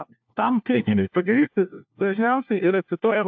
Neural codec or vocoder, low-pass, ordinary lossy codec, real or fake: codec, 16 kHz, 1 kbps, FunCodec, trained on LibriTTS, 50 frames a second; 7.2 kHz; AAC, 48 kbps; fake